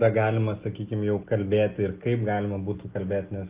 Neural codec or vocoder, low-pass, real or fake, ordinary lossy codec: none; 3.6 kHz; real; Opus, 32 kbps